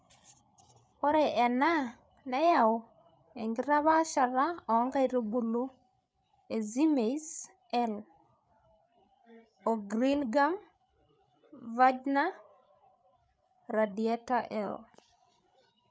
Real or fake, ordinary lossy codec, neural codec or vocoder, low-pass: fake; none; codec, 16 kHz, 8 kbps, FreqCodec, larger model; none